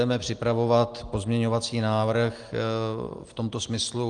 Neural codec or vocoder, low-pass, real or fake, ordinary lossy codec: none; 9.9 kHz; real; Opus, 32 kbps